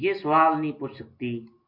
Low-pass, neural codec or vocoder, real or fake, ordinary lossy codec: 5.4 kHz; none; real; MP3, 32 kbps